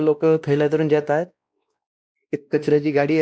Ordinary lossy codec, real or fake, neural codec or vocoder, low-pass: none; fake; codec, 16 kHz, 1 kbps, X-Codec, WavLM features, trained on Multilingual LibriSpeech; none